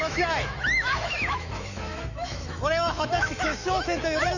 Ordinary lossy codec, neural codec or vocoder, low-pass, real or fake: none; autoencoder, 48 kHz, 128 numbers a frame, DAC-VAE, trained on Japanese speech; 7.2 kHz; fake